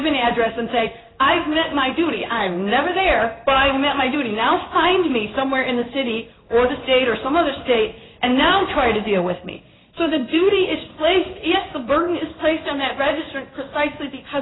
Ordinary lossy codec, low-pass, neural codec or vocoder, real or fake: AAC, 16 kbps; 7.2 kHz; codec, 16 kHz in and 24 kHz out, 1 kbps, XY-Tokenizer; fake